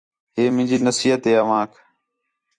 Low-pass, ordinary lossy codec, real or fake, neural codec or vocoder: 9.9 kHz; Opus, 64 kbps; fake; vocoder, 44.1 kHz, 128 mel bands every 256 samples, BigVGAN v2